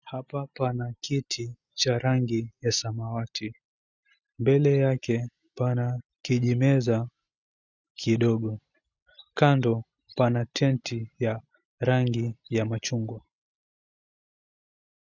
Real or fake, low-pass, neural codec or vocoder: real; 7.2 kHz; none